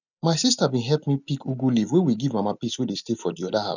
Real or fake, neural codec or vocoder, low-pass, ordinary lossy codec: real; none; 7.2 kHz; none